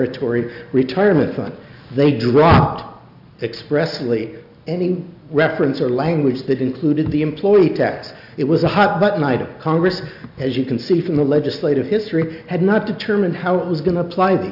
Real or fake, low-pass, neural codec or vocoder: real; 5.4 kHz; none